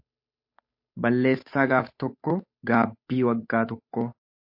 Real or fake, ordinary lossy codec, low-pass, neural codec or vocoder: fake; MP3, 32 kbps; 5.4 kHz; codec, 16 kHz, 8 kbps, FunCodec, trained on Chinese and English, 25 frames a second